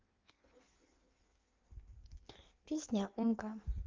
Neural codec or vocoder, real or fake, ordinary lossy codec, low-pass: codec, 16 kHz in and 24 kHz out, 1.1 kbps, FireRedTTS-2 codec; fake; Opus, 32 kbps; 7.2 kHz